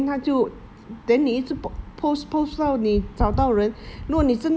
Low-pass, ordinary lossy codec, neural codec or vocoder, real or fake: none; none; none; real